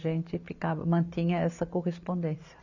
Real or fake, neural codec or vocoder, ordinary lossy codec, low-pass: real; none; none; 7.2 kHz